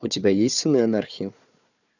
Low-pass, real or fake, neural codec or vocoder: 7.2 kHz; fake; codec, 16 kHz, 4 kbps, FunCodec, trained on Chinese and English, 50 frames a second